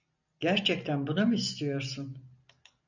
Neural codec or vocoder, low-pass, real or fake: none; 7.2 kHz; real